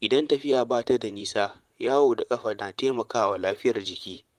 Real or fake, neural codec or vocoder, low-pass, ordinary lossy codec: fake; vocoder, 44.1 kHz, 128 mel bands, Pupu-Vocoder; 14.4 kHz; Opus, 32 kbps